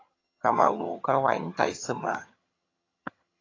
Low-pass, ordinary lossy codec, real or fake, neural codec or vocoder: 7.2 kHz; AAC, 32 kbps; fake; vocoder, 22.05 kHz, 80 mel bands, HiFi-GAN